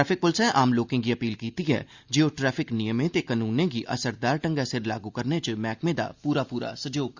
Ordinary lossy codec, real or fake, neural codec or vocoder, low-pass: Opus, 64 kbps; real; none; 7.2 kHz